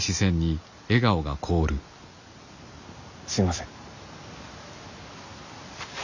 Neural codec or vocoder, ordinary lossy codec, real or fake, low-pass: none; none; real; 7.2 kHz